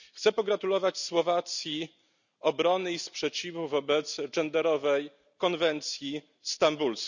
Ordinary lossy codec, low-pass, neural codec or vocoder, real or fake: none; 7.2 kHz; none; real